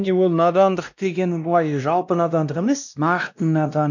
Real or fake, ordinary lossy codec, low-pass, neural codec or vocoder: fake; none; 7.2 kHz; codec, 16 kHz, 1 kbps, X-Codec, WavLM features, trained on Multilingual LibriSpeech